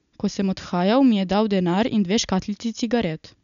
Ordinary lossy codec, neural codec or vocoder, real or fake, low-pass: none; none; real; 7.2 kHz